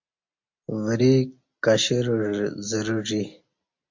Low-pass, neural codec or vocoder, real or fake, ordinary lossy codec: 7.2 kHz; none; real; MP3, 48 kbps